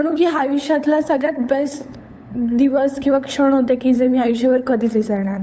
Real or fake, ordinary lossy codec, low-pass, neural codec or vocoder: fake; none; none; codec, 16 kHz, 8 kbps, FunCodec, trained on LibriTTS, 25 frames a second